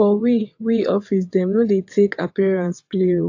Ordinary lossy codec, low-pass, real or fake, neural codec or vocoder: AAC, 48 kbps; 7.2 kHz; fake; vocoder, 22.05 kHz, 80 mel bands, WaveNeXt